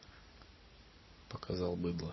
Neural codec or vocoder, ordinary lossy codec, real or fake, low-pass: none; MP3, 24 kbps; real; 7.2 kHz